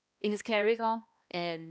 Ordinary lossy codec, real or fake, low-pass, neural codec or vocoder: none; fake; none; codec, 16 kHz, 1 kbps, X-Codec, HuBERT features, trained on balanced general audio